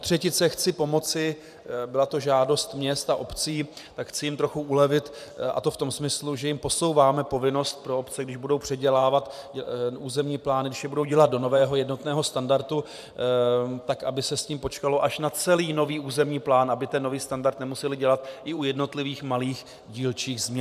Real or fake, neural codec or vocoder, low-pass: fake; vocoder, 44.1 kHz, 128 mel bands every 512 samples, BigVGAN v2; 14.4 kHz